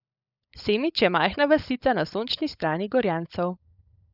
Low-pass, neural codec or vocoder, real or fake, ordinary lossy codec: 5.4 kHz; codec, 16 kHz, 16 kbps, FunCodec, trained on LibriTTS, 50 frames a second; fake; none